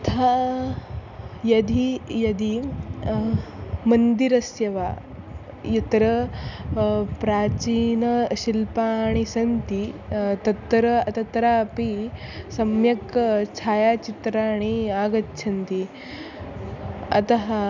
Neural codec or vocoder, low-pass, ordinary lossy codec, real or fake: none; 7.2 kHz; none; real